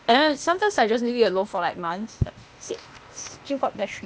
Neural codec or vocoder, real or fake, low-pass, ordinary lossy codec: codec, 16 kHz, 0.8 kbps, ZipCodec; fake; none; none